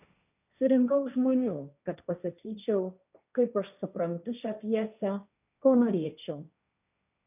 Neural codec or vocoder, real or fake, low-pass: codec, 16 kHz, 1.1 kbps, Voila-Tokenizer; fake; 3.6 kHz